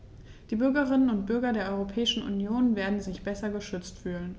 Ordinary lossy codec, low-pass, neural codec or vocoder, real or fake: none; none; none; real